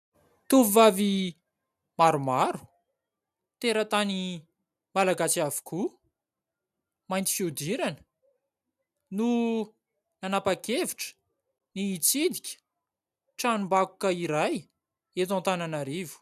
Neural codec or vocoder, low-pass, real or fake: none; 14.4 kHz; real